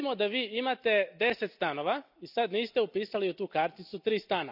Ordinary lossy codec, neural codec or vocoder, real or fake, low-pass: none; none; real; 5.4 kHz